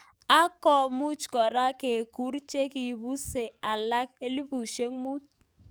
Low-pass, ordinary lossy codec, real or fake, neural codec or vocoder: none; none; fake; codec, 44.1 kHz, 7.8 kbps, DAC